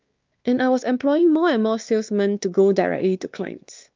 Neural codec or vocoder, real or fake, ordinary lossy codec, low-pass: codec, 16 kHz, 2 kbps, X-Codec, WavLM features, trained on Multilingual LibriSpeech; fake; Opus, 24 kbps; 7.2 kHz